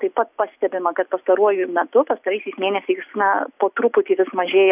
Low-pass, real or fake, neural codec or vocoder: 3.6 kHz; real; none